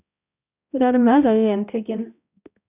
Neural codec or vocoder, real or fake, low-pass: codec, 16 kHz, 0.5 kbps, X-Codec, HuBERT features, trained on balanced general audio; fake; 3.6 kHz